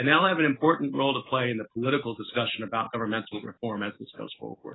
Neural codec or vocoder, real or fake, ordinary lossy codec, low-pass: none; real; AAC, 16 kbps; 7.2 kHz